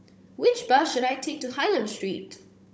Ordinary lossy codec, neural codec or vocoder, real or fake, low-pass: none; codec, 16 kHz, 16 kbps, FunCodec, trained on Chinese and English, 50 frames a second; fake; none